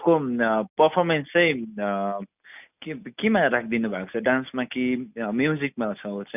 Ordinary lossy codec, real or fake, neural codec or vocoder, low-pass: none; real; none; 3.6 kHz